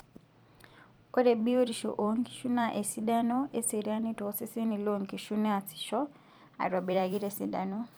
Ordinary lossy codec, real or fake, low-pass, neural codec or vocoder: none; fake; none; vocoder, 44.1 kHz, 128 mel bands every 256 samples, BigVGAN v2